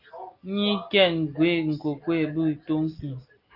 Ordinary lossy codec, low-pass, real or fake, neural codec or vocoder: Opus, 24 kbps; 5.4 kHz; real; none